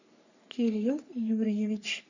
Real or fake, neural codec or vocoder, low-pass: fake; codec, 44.1 kHz, 3.4 kbps, Pupu-Codec; 7.2 kHz